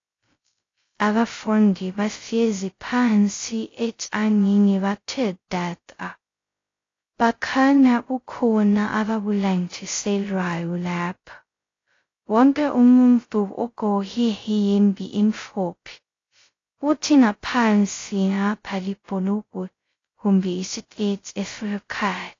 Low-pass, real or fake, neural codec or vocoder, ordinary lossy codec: 7.2 kHz; fake; codec, 16 kHz, 0.2 kbps, FocalCodec; AAC, 32 kbps